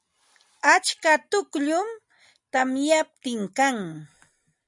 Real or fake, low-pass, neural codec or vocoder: real; 10.8 kHz; none